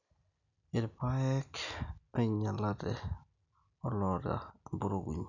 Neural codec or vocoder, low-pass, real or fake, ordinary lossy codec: none; 7.2 kHz; real; none